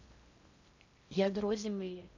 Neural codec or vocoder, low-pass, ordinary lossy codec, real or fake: codec, 16 kHz in and 24 kHz out, 0.6 kbps, FocalCodec, streaming, 4096 codes; 7.2 kHz; AAC, 48 kbps; fake